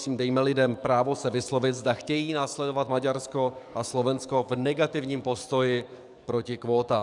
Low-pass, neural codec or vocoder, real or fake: 10.8 kHz; codec, 44.1 kHz, 7.8 kbps, DAC; fake